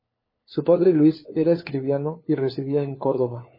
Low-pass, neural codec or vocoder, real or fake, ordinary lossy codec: 5.4 kHz; codec, 16 kHz, 4 kbps, FunCodec, trained on LibriTTS, 50 frames a second; fake; MP3, 24 kbps